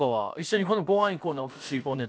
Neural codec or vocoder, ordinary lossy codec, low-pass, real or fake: codec, 16 kHz, about 1 kbps, DyCAST, with the encoder's durations; none; none; fake